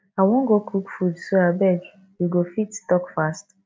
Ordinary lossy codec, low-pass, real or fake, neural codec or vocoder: none; none; real; none